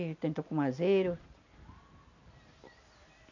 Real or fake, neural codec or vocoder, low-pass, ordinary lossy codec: real; none; 7.2 kHz; none